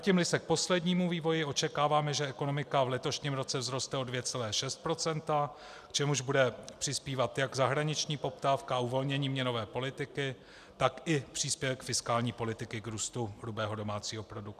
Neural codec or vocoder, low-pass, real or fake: none; 14.4 kHz; real